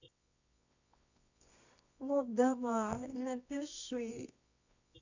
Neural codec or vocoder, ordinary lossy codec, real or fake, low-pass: codec, 24 kHz, 0.9 kbps, WavTokenizer, medium music audio release; none; fake; 7.2 kHz